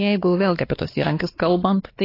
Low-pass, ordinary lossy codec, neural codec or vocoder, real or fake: 5.4 kHz; AAC, 24 kbps; codec, 16 kHz, 2 kbps, X-Codec, WavLM features, trained on Multilingual LibriSpeech; fake